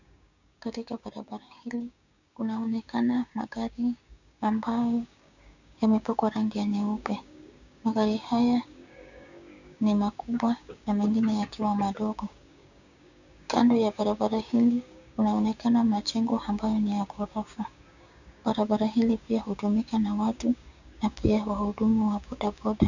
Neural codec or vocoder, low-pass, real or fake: codec, 16 kHz, 6 kbps, DAC; 7.2 kHz; fake